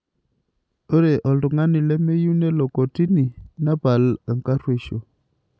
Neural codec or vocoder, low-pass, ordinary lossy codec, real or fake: none; none; none; real